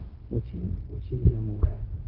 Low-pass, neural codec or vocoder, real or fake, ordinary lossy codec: 5.4 kHz; codec, 16 kHz, 0.4 kbps, LongCat-Audio-Codec; fake; Opus, 16 kbps